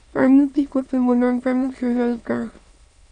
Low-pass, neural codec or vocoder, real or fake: 9.9 kHz; autoencoder, 22.05 kHz, a latent of 192 numbers a frame, VITS, trained on many speakers; fake